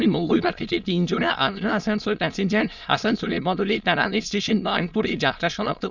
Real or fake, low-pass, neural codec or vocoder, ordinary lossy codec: fake; 7.2 kHz; autoencoder, 22.05 kHz, a latent of 192 numbers a frame, VITS, trained on many speakers; none